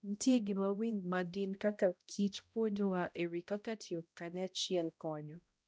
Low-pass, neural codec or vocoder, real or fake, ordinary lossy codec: none; codec, 16 kHz, 0.5 kbps, X-Codec, HuBERT features, trained on balanced general audio; fake; none